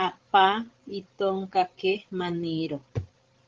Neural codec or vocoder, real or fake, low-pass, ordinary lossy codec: codec, 16 kHz, 16 kbps, FreqCodec, larger model; fake; 7.2 kHz; Opus, 16 kbps